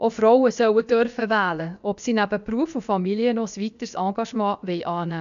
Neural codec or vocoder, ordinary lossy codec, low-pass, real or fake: codec, 16 kHz, about 1 kbps, DyCAST, with the encoder's durations; none; 7.2 kHz; fake